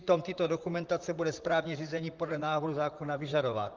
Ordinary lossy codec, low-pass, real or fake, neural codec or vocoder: Opus, 32 kbps; 7.2 kHz; fake; vocoder, 44.1 kHz, 128 mel bands, Pupu-Vocoder